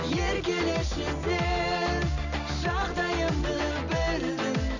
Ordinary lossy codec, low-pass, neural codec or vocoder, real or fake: none; 7.2 kHz; none; real